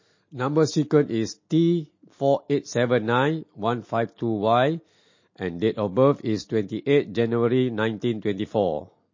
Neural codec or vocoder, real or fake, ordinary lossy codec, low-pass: none; real; MP3, 32 kbps; 7.2 kHz